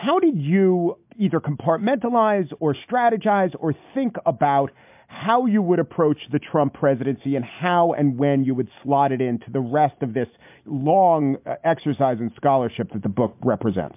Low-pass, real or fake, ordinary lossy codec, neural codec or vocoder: 3.6 kHz; real; MP3, 32 kbps; none